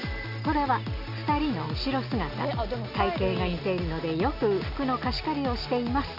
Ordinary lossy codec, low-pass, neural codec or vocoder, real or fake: none; 5.4 kHz; none; real